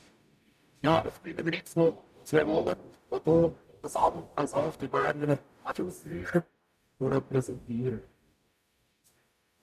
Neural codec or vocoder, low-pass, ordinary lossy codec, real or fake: codec, 44.1 kHz, 0.9 kbps, DAC; 14.4 kHz; none; fake